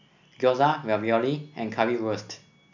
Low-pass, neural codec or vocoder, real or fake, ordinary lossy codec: 7.2 kHz; none; real; none